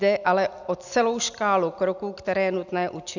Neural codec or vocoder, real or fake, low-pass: none; real; 7.2 kHz